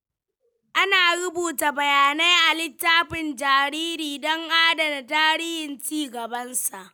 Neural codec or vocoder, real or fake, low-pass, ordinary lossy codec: none; real; none; none